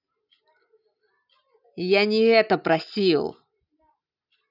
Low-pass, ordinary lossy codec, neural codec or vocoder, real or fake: 5.4 kHz; none; none; real